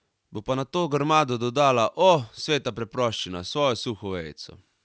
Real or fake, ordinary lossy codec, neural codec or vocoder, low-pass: real; none; none; none